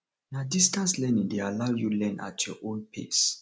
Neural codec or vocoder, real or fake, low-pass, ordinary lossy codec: none; real; none; none